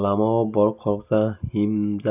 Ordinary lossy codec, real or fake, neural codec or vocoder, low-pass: none; real; none; 3.6 kHz